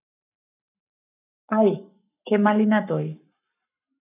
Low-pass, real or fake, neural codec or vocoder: 3.6 kHz; fake; codec, 44.1 kHz, 7.8 kbps, Pupu-Codec